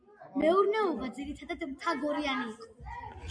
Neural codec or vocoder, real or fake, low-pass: none; real; 9.9 kHz